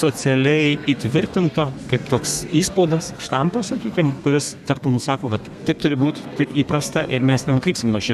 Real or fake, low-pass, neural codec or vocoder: fake; 14.4 kHz; codec, 32 kHz, 1.9 kbps, SNAC